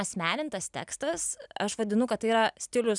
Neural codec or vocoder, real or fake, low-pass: none; real; 10.8 kHz